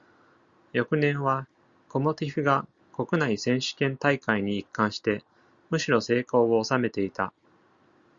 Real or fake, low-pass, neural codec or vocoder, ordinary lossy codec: real; 7.2 kHz; none; Opus, 64 kbps